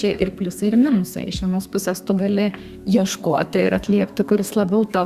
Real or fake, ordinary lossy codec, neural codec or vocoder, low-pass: fake; Opus, 64 kbps; codec, 32 kHz, 1.9 kbps, SNAC; 14.4 kHz